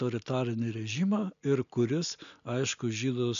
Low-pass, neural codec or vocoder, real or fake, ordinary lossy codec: 7.2 kHz; none; real; MP3, 96 kbps